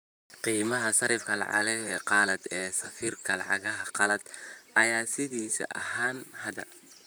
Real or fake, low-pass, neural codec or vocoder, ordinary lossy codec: fake; none; vocoder, 44.1 kHz, 128 mel bands, Pupu-Vocoder; none